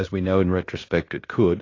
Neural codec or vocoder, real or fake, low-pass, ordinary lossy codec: codec, 16 kHz in and 24 kHz out, 0.9 kbps, LongCat-Audio-Codec, fine tuned four codebook decoder; fake; 7.2 kHz; AAC, 32 kbps